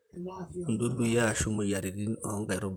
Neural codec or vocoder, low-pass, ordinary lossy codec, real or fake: vocoder, 44.1 kHz, 128 mel bands, Pupu-Vocoder; none; none; fake